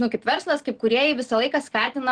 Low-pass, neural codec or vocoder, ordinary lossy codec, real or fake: 9.9 kHz; none; Opus, 16 kbps; real